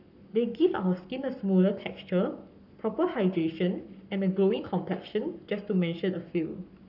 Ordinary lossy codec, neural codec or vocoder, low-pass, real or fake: none; codec, 44.1 kHz, 7.8 kbps, Pupu-Codec; 5.4 kHz; fake